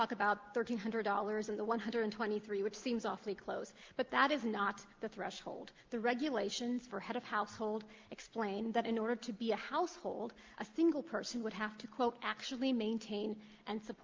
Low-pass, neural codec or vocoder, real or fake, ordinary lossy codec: 7.2 kHz; none; real; Opus, 32 kbps